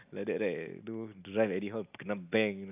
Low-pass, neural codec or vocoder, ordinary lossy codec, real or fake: 3.6 kHz; none; AAC, 32 kbps; real